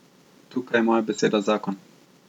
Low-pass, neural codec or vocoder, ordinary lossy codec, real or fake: 19.8 kHz; none; none; real